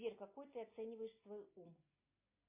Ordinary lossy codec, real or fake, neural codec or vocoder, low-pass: MP3, 24 kbps; real; none; 3.6 kHz